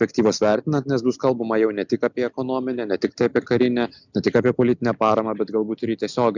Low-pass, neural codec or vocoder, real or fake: 7.2 kHz; none; real